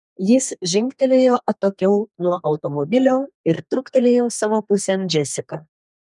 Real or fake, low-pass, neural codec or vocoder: fake; 10.8 kHz; codec, 32 kHz, 1.9 kbps, SNAC